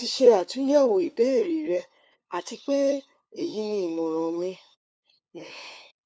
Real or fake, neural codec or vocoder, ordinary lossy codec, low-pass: fake; codec, 16 kHz, 2 kbps, FunCodec, trained on LibriTTS, 25 frames a second; none; none